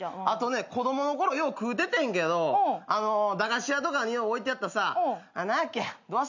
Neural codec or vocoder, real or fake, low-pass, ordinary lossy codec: none; real; 7.2 kHz; none